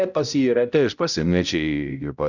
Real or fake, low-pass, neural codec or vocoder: fake; 7.2 kHz; codec, 16 kHz, 0.5 kbps, X-Codec, HuBERT features, trained on balanced general audio